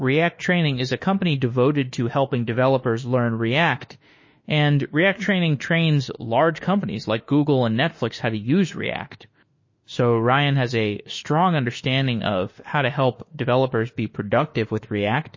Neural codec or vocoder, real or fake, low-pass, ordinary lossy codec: autoencoder, 48 kHz, 32 numbers a frame, DAC-VAE, trained on Japanese speech; fake; 7.2 kHz; MP3, 32 kbps